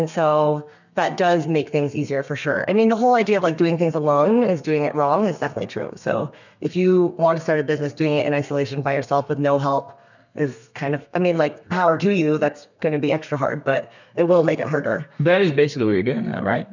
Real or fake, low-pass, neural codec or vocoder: fake; 7.2 kHz; codec, 32 kHz, 1.9 kbps, SNAC